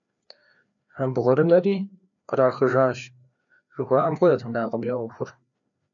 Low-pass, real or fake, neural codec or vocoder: 7.2 kHz; fake; codec, 16 kHz, 2 kbps, FreqCodec, larger model